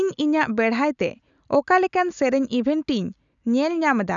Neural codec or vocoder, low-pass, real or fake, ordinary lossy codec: none; 7.2 kHz; real; none